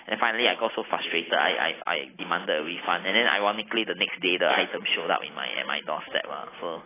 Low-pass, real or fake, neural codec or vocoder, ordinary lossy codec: 3.6 kHz; real; none; AAC, 16 kbps